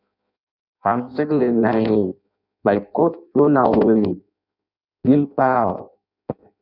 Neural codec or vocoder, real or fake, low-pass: codec, 16 kHz in and 24 kHz out, 0.6 kbps, FireRedTTS-2 codec; fake; 5.4 kHz